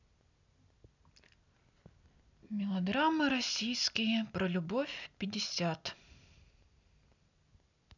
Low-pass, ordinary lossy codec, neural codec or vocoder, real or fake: 7.2 kHz; none; none; real